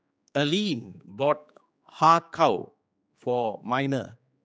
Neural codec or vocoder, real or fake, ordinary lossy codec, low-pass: codec, 16 kHz, 4 kbps, X-Codec, HuBERT features, trained on general audio; fake; none; none